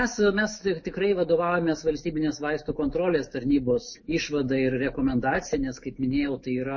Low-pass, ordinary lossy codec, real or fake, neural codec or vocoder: 7.2 kHz; MP3, 32 kbps; fake; autoencoder, 48 kHz, 128 numbers a frame, DAC-VAE, trained on Japanese speech